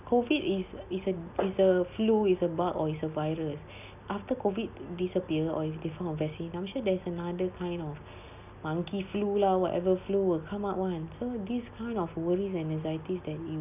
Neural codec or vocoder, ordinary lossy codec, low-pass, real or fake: none; none; 3.6 kHz; real